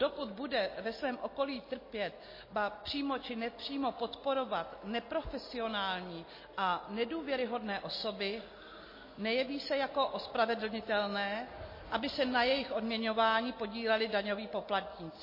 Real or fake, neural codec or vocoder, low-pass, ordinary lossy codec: real; none; 5.4 kHz; MP3, 24 kbps